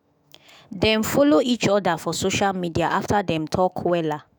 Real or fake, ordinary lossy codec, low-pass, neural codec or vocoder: fake; none; none; autoencoder, 48 kHz, 128 numbers a frame, DAC-VAE, trained on Japanese speech